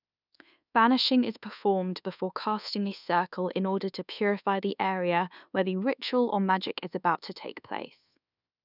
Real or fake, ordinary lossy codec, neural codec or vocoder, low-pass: fake; none; codec, 24 kHz, 1.2 kbps, DualCodec; 5.4 kHz